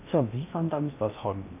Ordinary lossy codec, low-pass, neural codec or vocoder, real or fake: none; 3.6 kHz; codec, 16 kHz in and 24 kHz out, 0.6 kbps, FocalCodec, streaming, 2048 codes; fake